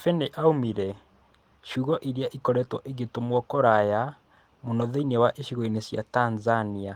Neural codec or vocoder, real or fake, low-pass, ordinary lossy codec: none; real; 19.8 kHz; Opus, 24 kbps